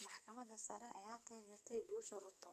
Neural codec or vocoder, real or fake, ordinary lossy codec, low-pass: codec, 44.1 kHz, 2.6 kbps, SNAC; fake; none; 14.4 kHz